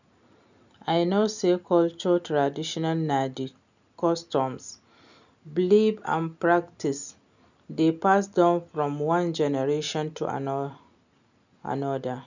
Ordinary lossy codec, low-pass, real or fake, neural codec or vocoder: none; 7.2 kHz; real; none